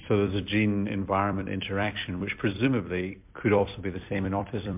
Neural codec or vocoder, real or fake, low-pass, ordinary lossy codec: vocoder, 44.1 kHz, 128 mel bands every 256 samples, BigVGAN v2; fake; 3.6 kHz; MP3, 32 kbps